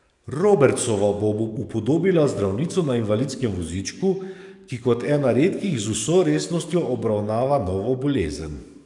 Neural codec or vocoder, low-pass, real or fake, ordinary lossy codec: codec, 44.1 kHz, 7.8 kbps, DAC; 10.8 kHz; fake; none